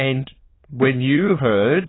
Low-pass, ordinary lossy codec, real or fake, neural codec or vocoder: 7.2 kHz; AAC, 16 kbps; fake; autoencoder, 22.05 kHz, a latent of 192 numbers a frame, VITS, trained on many speakers